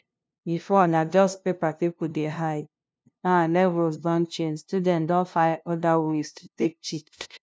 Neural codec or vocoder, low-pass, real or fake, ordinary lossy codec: codec, 16 kHz, 0.5 kbps, FunCodec, trained on LibriTTS, 25 frames a second; none; fake; none